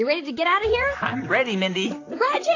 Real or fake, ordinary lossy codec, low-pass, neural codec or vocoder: fake; AAC, 32 kbps; 7.2 kHz; vocoder, 44.1 kHz, 128 mel bands, Pupu-Vocoder